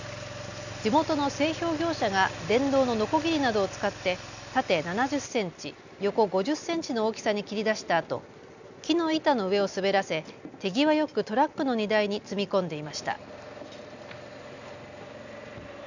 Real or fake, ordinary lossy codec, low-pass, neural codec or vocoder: real; none; 7.2 kHz; none